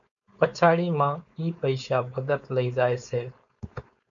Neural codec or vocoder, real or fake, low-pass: codec, 16 kHz, 4.8 kbps, FACodec; fake; 7.2 kHz